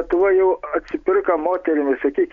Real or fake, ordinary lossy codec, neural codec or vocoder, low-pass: real; Opus, 64 kbps; none; 7.2 kHz